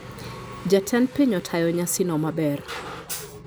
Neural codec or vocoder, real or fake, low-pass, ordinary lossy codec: none; real; none; none